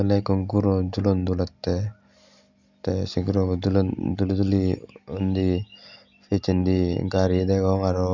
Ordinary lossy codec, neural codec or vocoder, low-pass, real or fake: none; none; 7.2 kHz; real